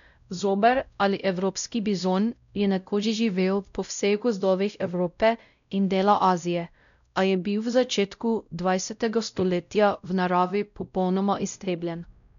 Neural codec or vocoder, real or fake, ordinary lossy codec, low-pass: codec, 16 kHz, 0.5 kbps, X-Codec, WavLM features, trained on Multilingual LibriSpeech; fake; none; 7.2 kHz